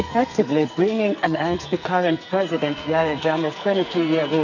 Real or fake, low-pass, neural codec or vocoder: fake; 7.2 kHz; codec, 44.1 kHz, 2.6 kbps, SNAC